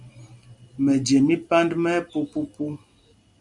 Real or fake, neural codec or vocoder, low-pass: real; none; 10.8 kHz